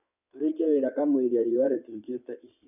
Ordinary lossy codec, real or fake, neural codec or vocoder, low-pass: none; fake; codec, 16 kHz in and 24 kHz out, 2.2 kbps, FireRedTTS-2 codec; 3.6 kHz